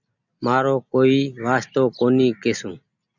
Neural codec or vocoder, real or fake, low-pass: none; real; 7.2 kHz